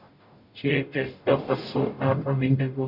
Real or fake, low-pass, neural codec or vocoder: fake; 5.4 kHz; codec, 44.1 kHz, 0.9 kbps, DAC